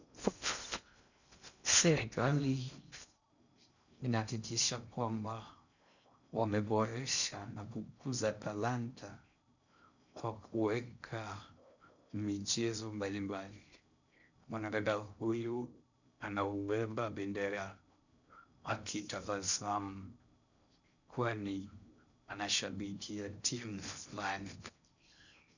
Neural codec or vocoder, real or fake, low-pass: codec, 16 kHz in and 24 kHz out, 0.6 kbps, FocalCodec, streaming, 2048 codes; fake; 7.2 kHz